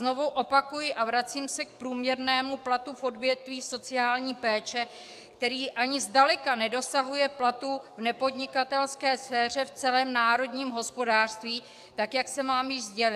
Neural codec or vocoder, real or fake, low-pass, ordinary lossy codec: codec, 44.1 kHz, 7.8 kbps, DAC; fake; 14.4 kHz; AAC, 96 kbps